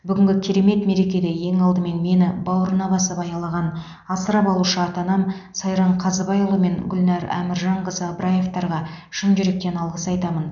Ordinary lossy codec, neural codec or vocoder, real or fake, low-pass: none; none; real; 7.2 kHz